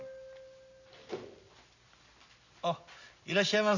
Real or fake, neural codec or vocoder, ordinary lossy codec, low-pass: real; none; none; 7.2 kHz